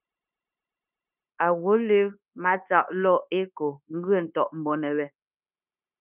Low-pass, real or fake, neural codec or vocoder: 3.6 kHz; fake; codec, 16 kHz, 0.9 kbps, LongCat-Audio-Codec